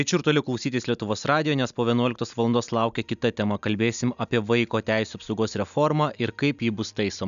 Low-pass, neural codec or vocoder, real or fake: 7.2 kHz; none; real